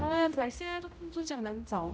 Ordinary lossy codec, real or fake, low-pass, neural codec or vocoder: none; fake; none; codec, 16 kHz, 0.5 kbps, X-Codec, HuBERT features, trained on general audio